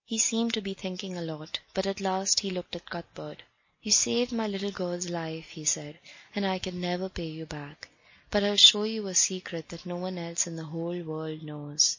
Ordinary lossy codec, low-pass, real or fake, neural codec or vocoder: MP3, 32 kbps; 7.2 kHz; real; none